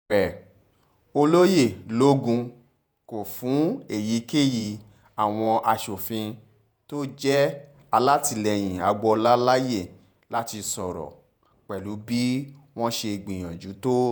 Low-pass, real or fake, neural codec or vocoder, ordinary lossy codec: none; fake; vocoder, 48 kHz, 128 mel bands, Vocos; none